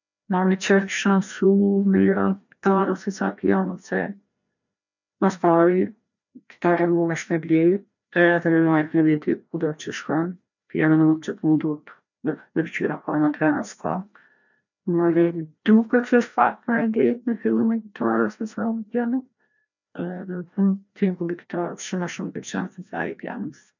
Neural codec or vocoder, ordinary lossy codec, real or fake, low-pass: codec, 16 kHz, 1 kbps, FreqCodec, larger model; none; fake; 7.2 kHz